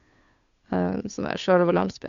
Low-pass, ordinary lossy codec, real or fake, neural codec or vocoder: 7.2 kHz; Opus, 64 kbps; fake; codec, 16 kHz, 2 kbps, FunCodec, trained on Chinese and English, 25 frames a second